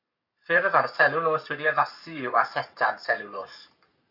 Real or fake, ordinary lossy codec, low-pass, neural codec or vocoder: fake; AAC, 48 kbps; 5.4 kHz; codec, 44.1 kHz, 7.8 kbps, Pupu-Codec